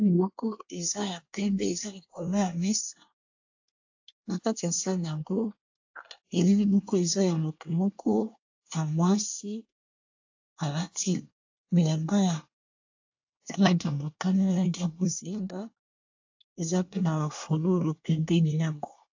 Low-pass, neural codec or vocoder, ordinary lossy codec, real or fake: 7.2 kHz; codec, 24 kHz, 1 kbps, SNAC; AAC, 48 kbps; fake